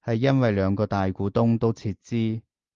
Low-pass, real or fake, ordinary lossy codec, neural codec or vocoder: 7.2 kHz; real; Opus, 32 kbps; none